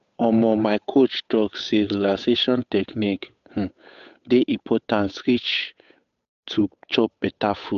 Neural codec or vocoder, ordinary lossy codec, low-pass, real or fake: codec, 16 kHz, 8 kbps, FunCodec, trained on Chinese and English, 25 frames a second; AAC, 96 kbps; 7.2 kHz; fake